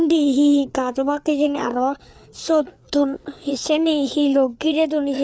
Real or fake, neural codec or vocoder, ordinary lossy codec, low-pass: fake; codec, 16 kHz, 4 kbps, FreqCodec, larger model; none; none